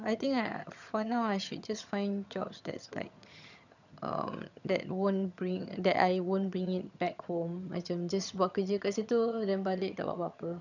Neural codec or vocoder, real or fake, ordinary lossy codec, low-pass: vocoder, 22.05 kHz, 80 mel bands, HiFi-GAN; fake; none; 7.2 kHz